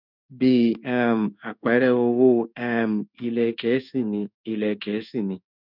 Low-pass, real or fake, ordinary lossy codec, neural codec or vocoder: 5.4 kHz; fake; none; codec, 16 kHz in and 24 kHz out, 1 kbps, XY-Tokenizer